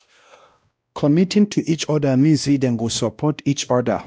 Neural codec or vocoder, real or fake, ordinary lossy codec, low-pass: codec, 16 kHz, 1 kbps, X-Codec, WavLM features, trained on Multilingual LibriSpeech; fake; none; none